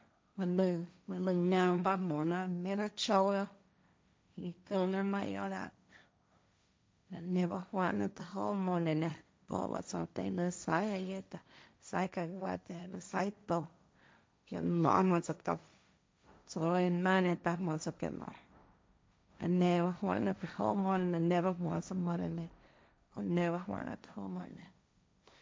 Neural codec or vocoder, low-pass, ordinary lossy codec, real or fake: codec, 16 kHz, 1.1 kbps, Voila-Tokenizer; none; none; fake